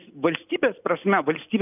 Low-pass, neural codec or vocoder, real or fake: 3.6 kHz; none; real